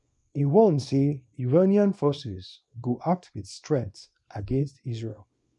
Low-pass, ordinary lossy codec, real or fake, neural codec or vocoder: 10.8 kHz; none; fake; codec, 24 kHz, 0.9 kbps, WavTokenizer, small release